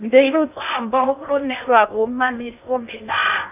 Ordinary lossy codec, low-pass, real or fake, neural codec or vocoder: none; 3.6 kHz; fake; codec, 16 kHz in and 24 kHz out, 0.6 kbps, FocalCodec, streaming, 2048 codes